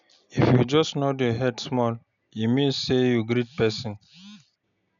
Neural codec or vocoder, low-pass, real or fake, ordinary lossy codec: none; 7.2 kHz; real; none